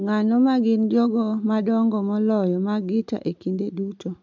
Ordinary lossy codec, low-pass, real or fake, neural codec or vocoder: MP3, 64 kbps; 7.2 kHz; real; none